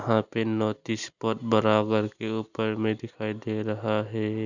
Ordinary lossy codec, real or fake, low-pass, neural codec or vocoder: none; real; 7.2 kHz; none